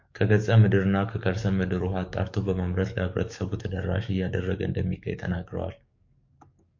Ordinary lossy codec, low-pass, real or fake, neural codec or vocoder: MP3, 48 kbps; 7.2 kHz; fake; codec, 44.1 kHz, 7.8 kbps, DAC